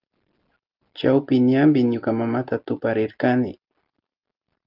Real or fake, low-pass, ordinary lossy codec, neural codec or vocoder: real; 5.4 kHz; Opus, 32 kbps; none